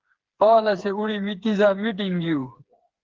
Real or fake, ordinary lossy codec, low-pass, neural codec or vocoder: fake; Opus, 16 kbps; 7.2 kHz; codec, 16 kHz, 4 kbps, FreqCodec, smaller model